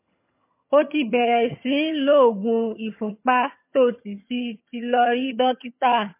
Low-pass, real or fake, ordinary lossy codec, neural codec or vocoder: 3.6 kHz; fake; MP3, 24 kbps; vocoder, 22.05 kHz, 80 mel bands, HiFi-GAN